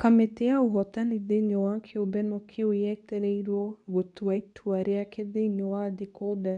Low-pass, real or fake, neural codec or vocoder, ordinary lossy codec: 10.8 kHz; fake; codec, 24 kHz, 0.9 kbps, WavTokenizer, medium speech release version 2; none